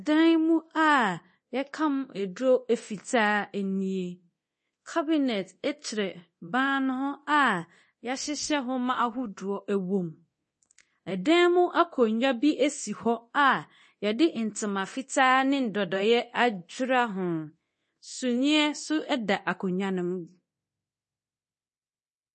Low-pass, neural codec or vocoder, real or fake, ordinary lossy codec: 10.8 kHz; codec, 24 kHz, 0.9 kbps, DualCodec; fake; MP3, 32 kbps